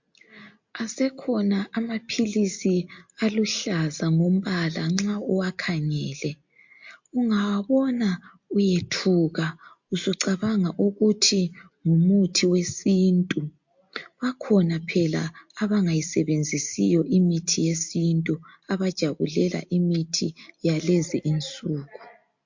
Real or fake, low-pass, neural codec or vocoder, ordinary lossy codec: real; 7.2 kHz; none; MP3, 48 kbps